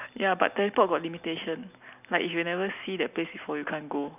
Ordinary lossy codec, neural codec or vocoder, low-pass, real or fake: none; none; 3.6 kHz; real